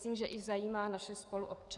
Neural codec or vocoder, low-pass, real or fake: codec, 44.1 kHz, 7.8 kbps, DAC; 10.8 kHz; fake